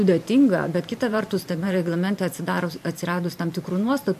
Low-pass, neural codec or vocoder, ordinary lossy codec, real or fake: 14.4 kHz; vocoder, 44.1 kHz, 128 mel bands every 512 samples, BigVGAN v2; MP3, 64 kbps; fake